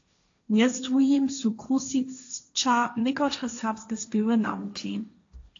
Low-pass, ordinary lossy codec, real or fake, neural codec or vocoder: 7.2 kHz; AAC, 48 kbps; fake; codec, 16 kHz, 1.1 kbps, Voila-Tokenizer